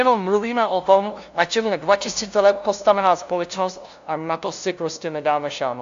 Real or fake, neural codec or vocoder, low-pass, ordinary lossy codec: fake; codec, 16 kHz, 0.5 kbps, FunCodec, trained on LibriTTS, 25 frames a second; 7.2 kHz; AAC, 64 kbps